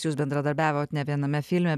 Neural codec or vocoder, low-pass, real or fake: none; 14.4 kHz; real